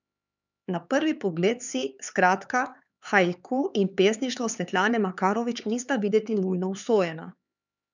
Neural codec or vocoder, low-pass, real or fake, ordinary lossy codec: codec, 16 kHz, 4 kbps, X-Codec, HuBERT features, trained on LibriSpeech; 7.2 kHz; fake; none